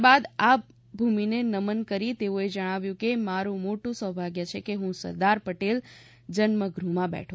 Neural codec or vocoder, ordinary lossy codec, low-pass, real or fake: none; none; none; real